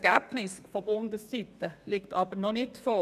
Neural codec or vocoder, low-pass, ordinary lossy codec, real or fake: codec, 32 kHz, 1.9 kbps, SNAC; 14.4 kHz; none; fake